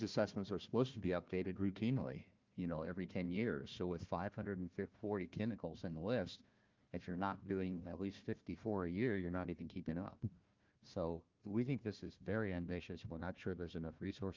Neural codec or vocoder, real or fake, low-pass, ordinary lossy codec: codec, 16 kHz, 1 kbps, FunCodec, trained on Chinese and English, 50 frames a second; fake; 7.2 kHz; Opus, 24 kbps